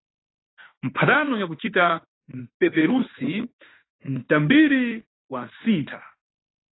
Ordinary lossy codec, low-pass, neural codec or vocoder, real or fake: AAC, 16 kbps; 7.2 kHz; autoencoder, 48 kHz, 32 numbers a frame, DAC-VAE, trained on Japanese speech; fake